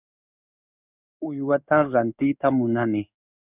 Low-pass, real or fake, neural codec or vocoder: 3.6 kHz; fake; codec, 16 kHz in and 24 kHz out, 1 kbps, XY-Tokenizer